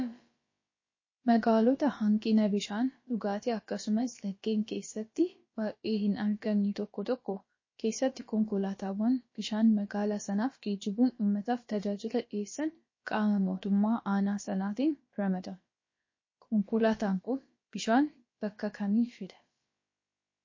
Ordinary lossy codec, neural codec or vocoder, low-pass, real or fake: MP3, 32 kbps; codec, 16 kHz, about 1 kbps, DyCAST, with the encoder's durations; 7.2 kHz; fake